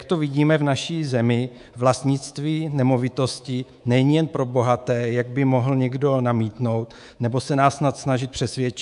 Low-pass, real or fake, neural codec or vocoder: 10.8 kHz; fake; codec, 24 kHz, 3.1 kbps, DualCodec